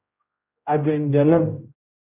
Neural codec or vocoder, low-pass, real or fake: codec, 16 kHz, 0.5 kbps, X-Codec, HuBERT features, trained on general audio; 3.6 kHz; fake